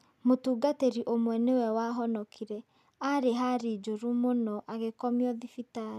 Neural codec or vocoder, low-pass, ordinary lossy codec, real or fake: none; 14.4 kHz; none; real